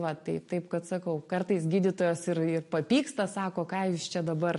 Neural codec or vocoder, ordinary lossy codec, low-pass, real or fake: none; MP3, 48 kbps; 14.4 kHz; real